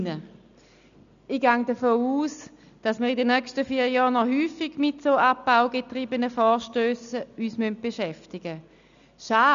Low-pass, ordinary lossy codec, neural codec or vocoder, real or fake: 7.2 kHz; none; none; real